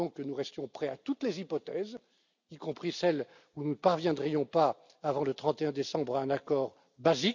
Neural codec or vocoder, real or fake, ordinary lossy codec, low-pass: none; real; none; 7.2 kHz